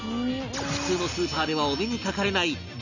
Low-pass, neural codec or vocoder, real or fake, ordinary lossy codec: 7.2 kHz; none; real; none